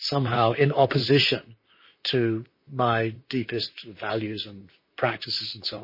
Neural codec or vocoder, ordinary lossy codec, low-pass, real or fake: vocoder, 44.1 kHz, 128 mel bands, Pupu-Vocoder; MP3, 24 kbps; 5.4 kHz; fake